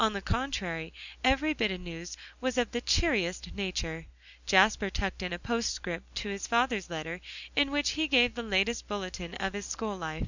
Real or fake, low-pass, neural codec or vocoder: real; 7.2 kHz; none